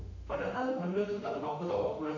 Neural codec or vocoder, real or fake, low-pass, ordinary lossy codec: autoencoder, 48 kHz, 32 numbers a frame, DAC-VAE, trained on Japanese speech; fake; 7.2 kHz; none